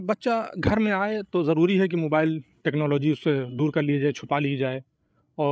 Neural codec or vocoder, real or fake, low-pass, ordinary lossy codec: codec, 16 kHz, 8 kbps, FreqCodec, larger model; fake; none; none